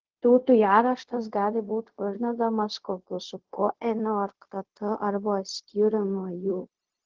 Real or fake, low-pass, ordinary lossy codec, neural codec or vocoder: fake; 7.2 kHz; Opus, 16 kbps; codec, 16 kHz, 0.4 kbps, LongCat-Audio-Codec